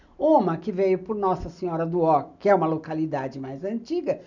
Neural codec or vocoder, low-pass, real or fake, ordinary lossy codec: none; 7.2 kHz; real; none